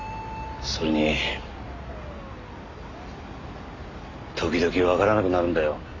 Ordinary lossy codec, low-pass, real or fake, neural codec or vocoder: AAC, 32 kbps; 7.2 kHz; real; none